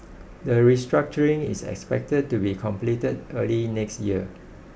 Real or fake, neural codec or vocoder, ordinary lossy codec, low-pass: real; none; none; none